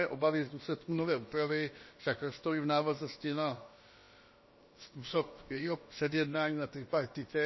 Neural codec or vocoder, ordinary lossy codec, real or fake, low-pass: codec, 16 kHz, about 1 kbps, DyCAST, with the encoder's durations; MP3, 24 kbps; fake; 7.2 kHz